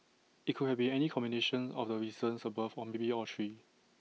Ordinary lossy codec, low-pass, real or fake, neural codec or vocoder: none; none; real; none